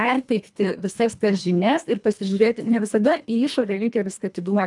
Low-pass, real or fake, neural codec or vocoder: 10.8 kHz; fake; codec, 24 kHz, 1.5 kbps, HILCodec